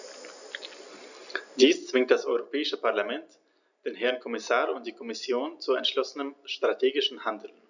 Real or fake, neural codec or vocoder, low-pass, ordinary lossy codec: real; none; 7.2 kHz; none